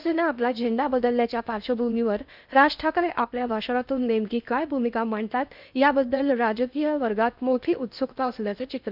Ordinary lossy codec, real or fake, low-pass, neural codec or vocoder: none; fake; 5.4 kHz; codec, 16 kHz in and 24 kHz out, 0.6 kbps, FocalCodec, streaming, 2048 codes